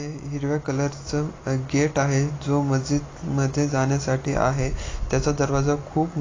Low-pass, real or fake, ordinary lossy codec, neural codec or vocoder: 7.2 kHz; real; AAC, 32 kbps; none